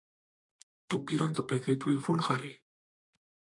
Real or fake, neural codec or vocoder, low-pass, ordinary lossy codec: fake; codec, 32 kHz, 1.9 kbps, SNAC; 10.8 kHz; MP3, 64 kbps